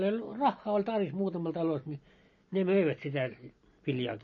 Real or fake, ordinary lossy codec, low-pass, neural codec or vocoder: real; MP3, 32 kbps; 7.2 kHz; none